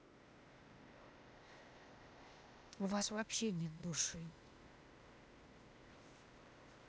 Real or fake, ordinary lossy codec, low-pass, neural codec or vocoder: fake; none; none; codec, 16 kHz, 0.8 kbps, ZipCodec